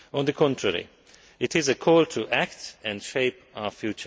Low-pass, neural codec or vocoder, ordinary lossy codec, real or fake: none; none; none; real